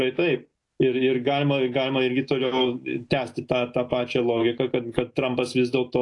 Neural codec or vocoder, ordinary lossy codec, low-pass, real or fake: none; AAC, 48 kbps; 10.8 kHz; real